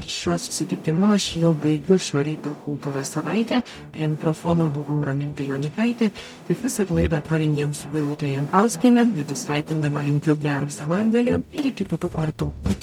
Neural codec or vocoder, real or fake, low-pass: codec, 44.1 kHz, 0.9 kbps, DAC; fake; 19.8 kHz